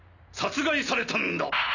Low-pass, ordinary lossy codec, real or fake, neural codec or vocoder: 7.2 kHz; none; real; none